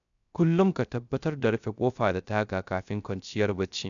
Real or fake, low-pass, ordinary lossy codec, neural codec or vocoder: fake; 7.2 kHz; none; codec, 16 kHz, 0.3 kbps, FocalCodec